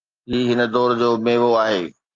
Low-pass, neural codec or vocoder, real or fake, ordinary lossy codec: 7.2 kHz; none; real; Opus, 32 kbps